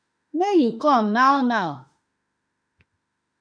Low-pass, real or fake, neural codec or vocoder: 9.9 kHz; fake; autoencoder, 48 kHz, 32 numbers a frame, DAC-VAE, trained on Japanese speech